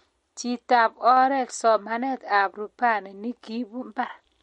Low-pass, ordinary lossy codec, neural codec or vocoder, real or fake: 19.8 kHz; MP3, 48 kbps; none; real